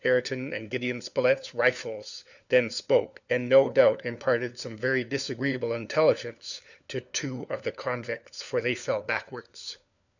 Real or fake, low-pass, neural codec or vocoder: fake; 7.2 kHz; codec, 16 kHz, 4 kbps, FunCodec, trained on LibriTTS, 50 frames a second